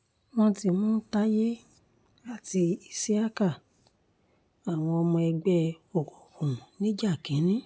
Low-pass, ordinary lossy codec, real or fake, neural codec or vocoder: none; none; real; none